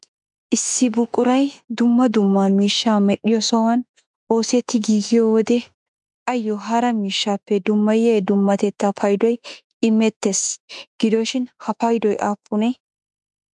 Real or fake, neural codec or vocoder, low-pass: fake; autoencoder, 48 kHz, 32 numbers a frame, DAC-VAE, trained on Japanese speech; 10.8 kHz